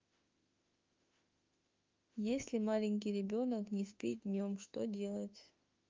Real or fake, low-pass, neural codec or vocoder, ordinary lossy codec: fake; 7.2 kHz; autoencoder, 48 kHz, 32 numbers a frame, DAC-VAE, trained on Japanese speech; Opus, 24 kbps